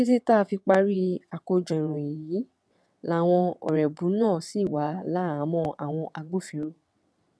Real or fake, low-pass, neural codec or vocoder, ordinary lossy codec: fake; none; vocoder, 22.05 kHz, 80 mel bands, WaveNeXt; none